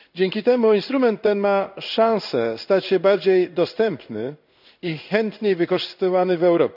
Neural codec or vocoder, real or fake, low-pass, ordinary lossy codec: codec, 16 kHz in and 24 kHz out, 1 kbps, XY-Tokenizer; fake; 5.4 kHz; none